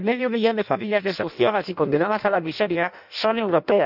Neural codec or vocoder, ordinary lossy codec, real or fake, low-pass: codec, 16 kHz in and 24 kHz out, 0.6 kbps, FireRedTTS-2 codec; none; fake; 5.4 kHz